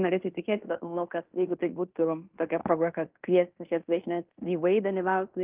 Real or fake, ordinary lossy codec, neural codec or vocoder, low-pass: fake; Opus, 32 kbps; codec, 16 kHz in and 24 kHz out, 0.9 kbps, LongCat-Audio-Codec, fine tuned four codebook decoder; 3.6 kHz